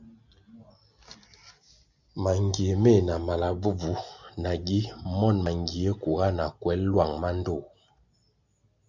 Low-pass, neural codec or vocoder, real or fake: 7.2 kHz; none; real